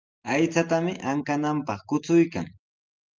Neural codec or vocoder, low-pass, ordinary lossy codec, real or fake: none; 7.2 kHz; Opus, 24 kbps; real